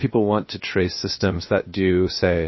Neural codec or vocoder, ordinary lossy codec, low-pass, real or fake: codec, 16 kHz, 0.7 kbps, FocalCodec; MP3, 24 kbps; 7.2 kHz; fake